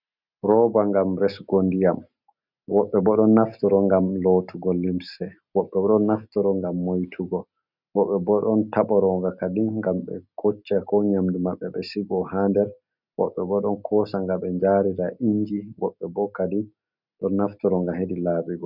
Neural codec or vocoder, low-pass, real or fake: none; 5.4 kHz; real